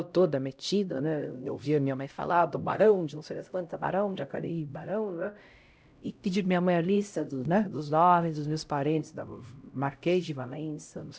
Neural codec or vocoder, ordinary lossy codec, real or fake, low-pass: codec, 16 kHz, 0.5 kbps, X-Codec, HuBERT features, trained on LibriSpeech; none; fake; none